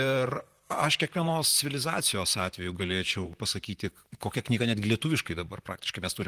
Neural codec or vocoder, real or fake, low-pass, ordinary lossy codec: vocoder, 44.1 kHz, 128 mel bands, Pupu-Vocoder; fake; 14.4 kHz; Opus, 24 kbps